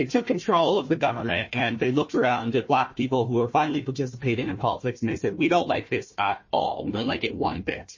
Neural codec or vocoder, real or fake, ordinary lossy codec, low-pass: codec, 16 kHz, 1 kbps, FunCodec, trained on Chinese and English, 50 frames a second; fake; MP3, 32 kbps; 7.2 kHz